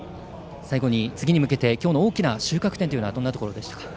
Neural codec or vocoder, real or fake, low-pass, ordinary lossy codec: none; real; none; none